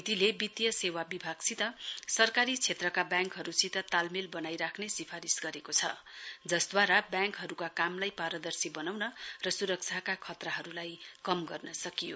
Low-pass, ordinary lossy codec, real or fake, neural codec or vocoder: none; none; real; none